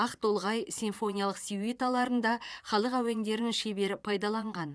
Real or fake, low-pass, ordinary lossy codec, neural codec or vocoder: fake; none; none; vocoder, 22.05 kHz, 80 mel bands, Vocos